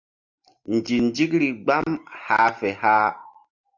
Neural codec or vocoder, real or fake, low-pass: none; real; 7.2 kHz